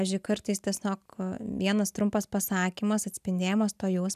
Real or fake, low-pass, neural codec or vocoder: real; 14.4 kHz; none